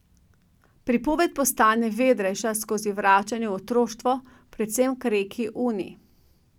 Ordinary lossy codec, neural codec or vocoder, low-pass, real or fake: none; none; 19.8 kHz; real